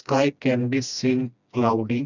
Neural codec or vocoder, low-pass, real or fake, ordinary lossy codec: codec, 16 kHz, 1 kbps, FreqCodec, smaller model; 7.2 kHz; fake; none